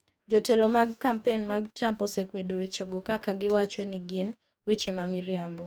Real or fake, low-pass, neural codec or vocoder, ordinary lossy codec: fake; none; codec, 44.1 kHz, 2.6 kbps, DAC; none